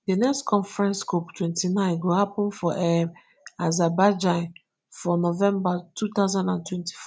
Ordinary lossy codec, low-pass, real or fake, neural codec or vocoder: none; none; real; none